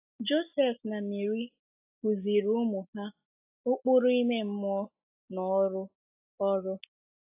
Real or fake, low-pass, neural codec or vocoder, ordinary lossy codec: real; 3.6 kHz; none; AAC, 32 kbps